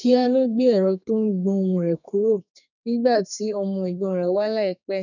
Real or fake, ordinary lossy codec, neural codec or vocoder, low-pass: fake; none; codec, 32 kHz, 1.9 kbps, SNAC; 7.2 kHz